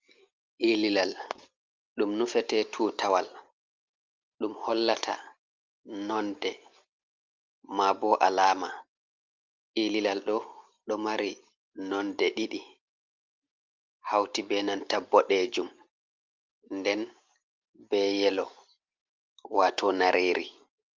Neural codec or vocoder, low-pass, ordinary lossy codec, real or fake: none; 7.2 kHz; Opus, 32 kbps; real